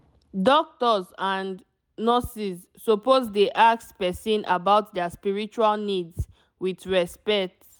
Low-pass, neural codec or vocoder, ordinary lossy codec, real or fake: none; none; none; real